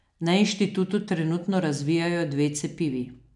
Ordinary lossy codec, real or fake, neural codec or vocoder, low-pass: none; real; none; 10.8 kHz